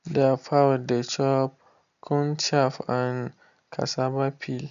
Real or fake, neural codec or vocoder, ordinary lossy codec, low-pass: real; none; none; 7.2 kHz